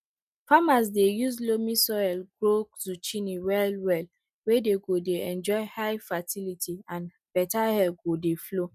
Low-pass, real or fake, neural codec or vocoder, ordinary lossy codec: 14.4 kHz; real; none; none